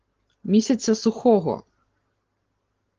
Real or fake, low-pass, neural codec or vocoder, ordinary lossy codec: real; 7.2 kHz; none; Opus, 16 kbps